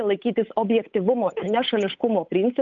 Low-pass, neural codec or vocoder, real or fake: 7.2 kHz; codec, 16 kHz, 8 kbps, FunCodec, trained on Chinese and English, 25 frames a second; fake